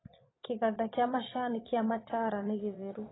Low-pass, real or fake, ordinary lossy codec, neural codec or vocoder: 7.2 kHz; fake; AAC, 16 kbps; vocoder, 22.05 kHz, 80 mel bands, Vocos